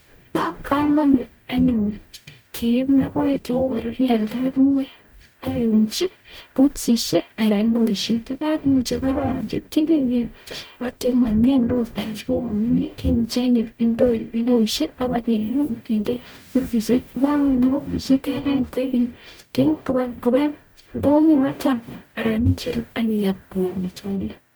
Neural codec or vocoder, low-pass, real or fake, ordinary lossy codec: codec, 44.1 kHz, 0.9 kbps, DAC; none; fake; none